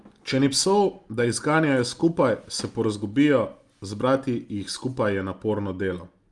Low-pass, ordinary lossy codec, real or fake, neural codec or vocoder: 10.8 kHz; Opus, 32 kbps; real; none